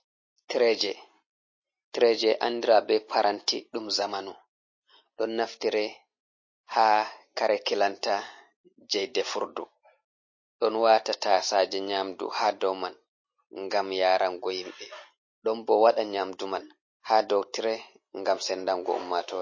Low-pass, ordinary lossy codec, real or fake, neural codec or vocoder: 7.2 kHz; MP3, 32 kbps; real; none